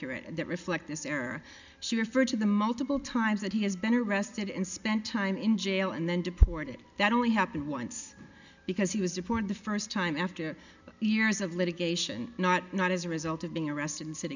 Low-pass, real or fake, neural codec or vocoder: 7.2 kHz; real; none